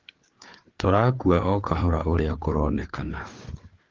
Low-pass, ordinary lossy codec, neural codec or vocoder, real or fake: 7.2 kHz; Opus, 16 kbps; codec, 16 kHz, 4 kbps, FreqCodec, larger model; fake